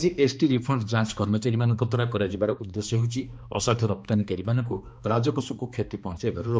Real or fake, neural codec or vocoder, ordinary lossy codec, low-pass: fake; codec, 16 kHz, 2 kbps, X-Codec, HuBERT features, trained on balanced general audio; none; none